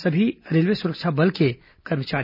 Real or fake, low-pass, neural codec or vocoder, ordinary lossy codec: real; 5.4 kHz; none; none